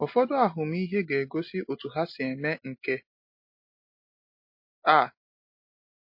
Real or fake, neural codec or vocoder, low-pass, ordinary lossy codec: real; none; 5.4 kHz; MP3, 32 kbps